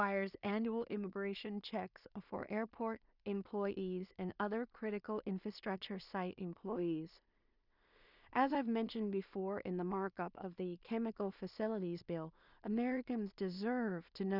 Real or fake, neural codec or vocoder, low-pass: fake; codec, 16 kHz in and 24 kHz out, 0.4 kbps, LongCat-Audio-Codec, two codebook decoder; 5.4 kHz